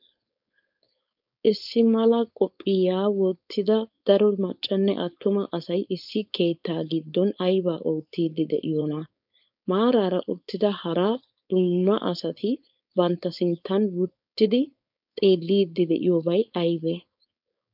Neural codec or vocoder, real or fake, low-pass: codec, 16 kHz, 4.8 kbps, FACodec; fake; 5.4 kHz